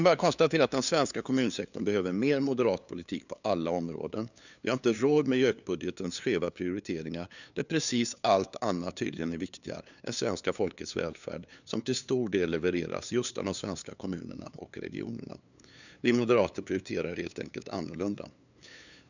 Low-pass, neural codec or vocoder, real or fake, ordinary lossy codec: 7.2 kHz; codec, 16 kHz, 2 kbps, FunCodec, trained on LibriTTS, 25 frames a second; fake; none